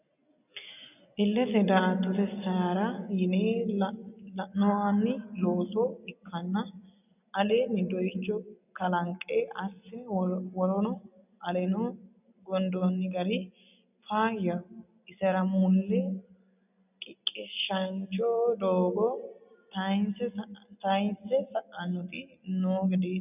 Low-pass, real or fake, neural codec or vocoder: 3.6 kHz; real; none